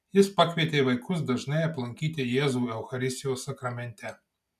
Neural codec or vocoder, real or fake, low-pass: none; real; 14.4 kHz